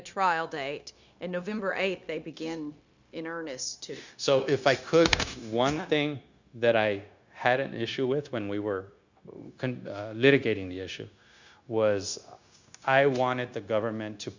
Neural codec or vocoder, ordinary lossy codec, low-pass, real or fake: codec, 16 kHz, 0.9 kbps, LongCat-Audio-Codec; Opus, 64 kbps; 7.2 kHz; fake